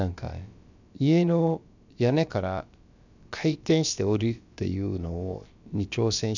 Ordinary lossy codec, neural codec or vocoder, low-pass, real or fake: none; codec, 16 kHz, about 1 kbps, DyCAST, with the encoder's durations; 7.2 kHz; fake